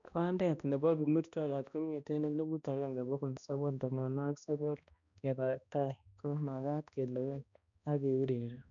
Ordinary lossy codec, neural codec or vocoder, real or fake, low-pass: none; codec, 16 kHz, 1 kbps, X-Codec, HuBERT features, trained on balanced general audio; fake; 7.2 kHz